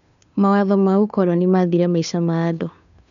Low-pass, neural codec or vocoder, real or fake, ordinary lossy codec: 7.2 kHz; codec, 16 kHz, 2 kbps, FunCodec, trained on Chinese and English, 25 frames a second; fake; none